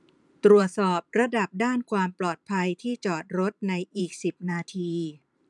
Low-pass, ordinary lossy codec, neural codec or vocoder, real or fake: 10.8 kHz; none; vocoder, 44.1 kHz, 128 mel bands every 512 samples, BigVGAN v2; fake